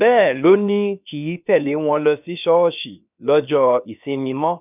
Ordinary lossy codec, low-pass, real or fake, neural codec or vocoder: none; 3.6 kHz; fake; codec, 16 kHz, 0.7 kbps, FocalCodec